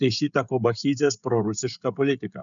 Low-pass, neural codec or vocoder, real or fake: 7.2 kHz; codec, 16 kHz, 16 kbps, FreqCodec, smaller model; fake